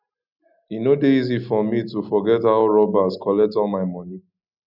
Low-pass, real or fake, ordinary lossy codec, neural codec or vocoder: 5.4 kHz; real; none; none